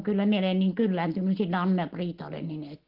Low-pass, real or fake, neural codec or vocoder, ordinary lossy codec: 5.4 kHz; real; none; Opus, 16 kbps